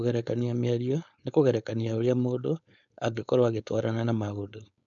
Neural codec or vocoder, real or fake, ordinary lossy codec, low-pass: codec, 16 kHz, 4.8 kbps, FACodec; fake; none; 7.2 kHz